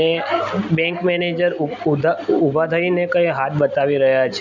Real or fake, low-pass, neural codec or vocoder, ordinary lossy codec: real; 7.2 kHz; none; none